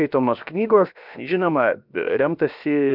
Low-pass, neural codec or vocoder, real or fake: 5.4 kHz; codec, 16 kHz, 0.7 kbps, FocalCodec; fake